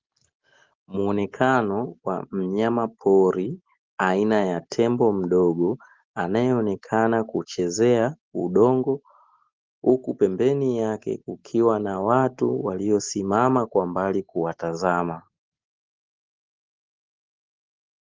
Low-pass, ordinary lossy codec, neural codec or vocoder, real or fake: 7.2 kHz; Opus, 16 kbps; none; real